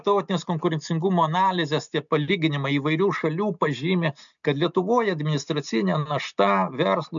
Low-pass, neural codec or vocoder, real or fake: 7.2 kHz; none; real